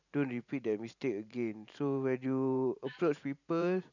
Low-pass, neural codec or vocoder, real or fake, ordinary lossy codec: 7.2 kHz; vocoder, 44.1 kHz, 128 mel bands every 512 samples, BigVGAN v2; fake; none